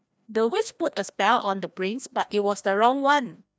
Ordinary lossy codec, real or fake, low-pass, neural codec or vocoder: none; fake; none; codec, 16 kHz, 1 kbps, FreqCodec, larger model